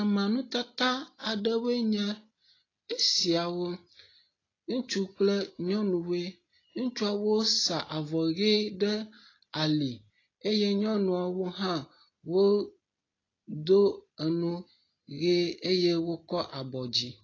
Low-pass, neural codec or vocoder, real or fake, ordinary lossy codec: 7.2 kHz; none; real; AAC, 32 kbps